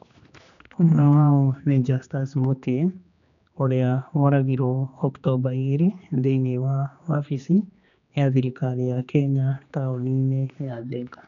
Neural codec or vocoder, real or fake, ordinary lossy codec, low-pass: codec, 16 kHz, 2 kbps, X-Codec, HuBERT features, trained on general audio; fake; none; 7.2 kHz